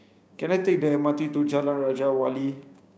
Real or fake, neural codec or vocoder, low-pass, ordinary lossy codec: fake; codec, 16 kHz, 6 kbps, DAC; none; none